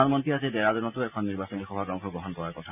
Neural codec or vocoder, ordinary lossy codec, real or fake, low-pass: none; none; real; 3.6 kHz